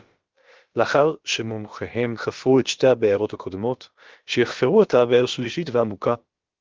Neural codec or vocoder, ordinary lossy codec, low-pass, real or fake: codec, 16 kHz, about 1 kbps, DyCAST, with the encoder's durations; Opus, 16 kbps; 7.2 kHz; fake